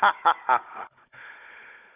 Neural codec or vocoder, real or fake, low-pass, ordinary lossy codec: codec, 16 kHz, 16 kbps, FunCodec, trained on Chinese and English, 50 frames a second; fake; 3.6 kHz; none